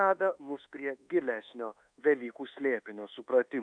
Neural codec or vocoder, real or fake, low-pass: codec, 24 kHz, 1.2 kbps, DualCodec; fake; 9.9 kHz